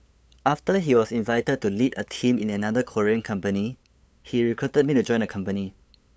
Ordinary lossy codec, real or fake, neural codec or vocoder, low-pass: none; fake; codec, 16 kHz, 8 kbps, FunCodec, trained on LibriTTS, 25 frames a second; none